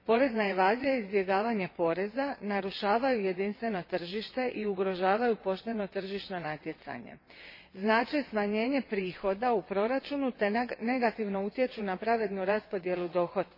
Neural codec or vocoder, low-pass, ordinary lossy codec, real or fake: vocoder, 22.05 kHz, 80 mel bands, WaveNeXt; 5.4 kHz; MP3, 24 kbps; fake